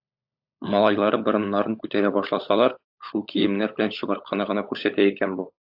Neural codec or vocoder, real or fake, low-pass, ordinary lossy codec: codec, 16 kHz, 16 kbps, FunCodec, trained on LibriTTS, 50 frames a second; fake; 5.4 kHz; AAC, 48 kbps